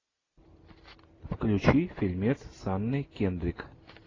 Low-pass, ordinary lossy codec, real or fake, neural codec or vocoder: 7.2 kHz; AAC, 32 kbps; real; none